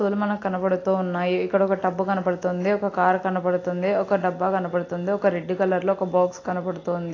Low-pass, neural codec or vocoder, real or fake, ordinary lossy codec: 7.2 kHz; none; real; AAC, 32 kbps